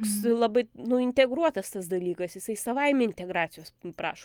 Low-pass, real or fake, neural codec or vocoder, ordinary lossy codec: 19.8 kHz; real; none; Opus, 32 kbps